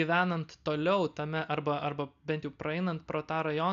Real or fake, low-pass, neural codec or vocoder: real; 7.2 kHz; none